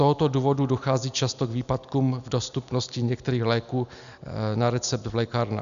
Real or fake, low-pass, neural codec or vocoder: real; 7.2 kHz; none